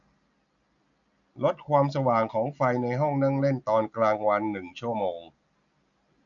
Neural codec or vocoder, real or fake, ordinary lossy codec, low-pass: none; real; none; 7.2 kHz